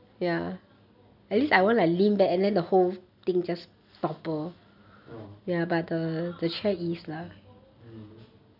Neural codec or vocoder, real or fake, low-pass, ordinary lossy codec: none; real; 5.4 kHz; none